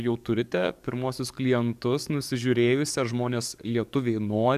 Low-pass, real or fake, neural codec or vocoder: 14.4 kHz; fake; codec, 44.1 kHz, 7.8 kbps, DAC